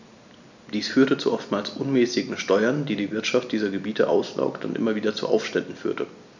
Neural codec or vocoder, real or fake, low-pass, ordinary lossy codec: none; real; 7.2 kHz; none